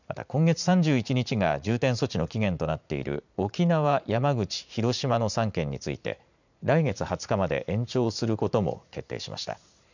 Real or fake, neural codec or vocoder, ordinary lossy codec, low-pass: fake; vocoder, 44.1 kHz, 80 mel bands, Vocos; none; 7.2 kHz